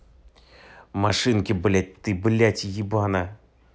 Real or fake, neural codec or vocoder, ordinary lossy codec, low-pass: real; none; none; none